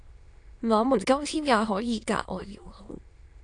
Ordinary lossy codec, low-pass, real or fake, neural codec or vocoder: AAC, 48 kbps; 9.9 kHz; fake; autoencoder, 22.05 kHz, a latent of 192 numbers a frame, VITS, trained on many speakers